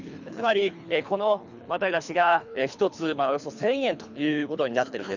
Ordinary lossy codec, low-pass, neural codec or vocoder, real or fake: none; 7.2 kHz; codec, 24 kHz, 3 kbps, HILCodec; fake